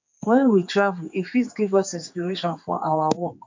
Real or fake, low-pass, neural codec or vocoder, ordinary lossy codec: fake; 7.2 kHz; codec, 16 kHz, 4 kbps, X-Codec, HuBERT features, trained on general audio; MP3, 64 kbps